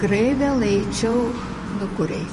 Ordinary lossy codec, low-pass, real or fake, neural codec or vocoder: MP3, 48 kbps; 10.8 kHz; fake; vocoder, 24 kHz, 100 mel bands, Vocos